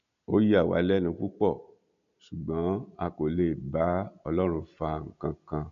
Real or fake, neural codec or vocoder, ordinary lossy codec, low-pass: real; none; none; 7.2 kHz